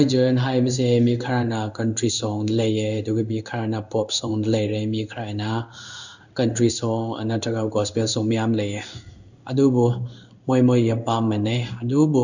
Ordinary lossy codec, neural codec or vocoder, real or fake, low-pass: none; codec, 16 kHz in and 24 kHz out, 1 kbps, XY-Tokenizer; fake; 7.2 kHz